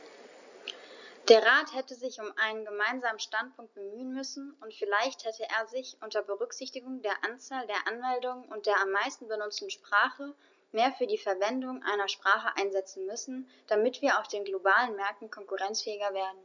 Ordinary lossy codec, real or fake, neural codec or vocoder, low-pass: none; real; none; 7.2 kHz